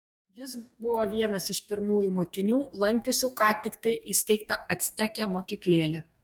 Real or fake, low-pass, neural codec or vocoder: fake; 19.8 kHz; codec, 44.1 kHz, 2.6 kbps, DAC